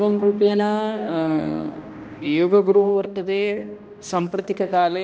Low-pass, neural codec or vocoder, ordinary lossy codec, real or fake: none; codec, 16 kHz, 1 kbps, X-Codec, HuBERT features, trained on balanced general audio; none; fake